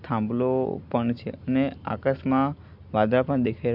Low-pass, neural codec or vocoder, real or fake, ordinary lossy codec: 5.4 kHz; none; real; MP3, 48 kbps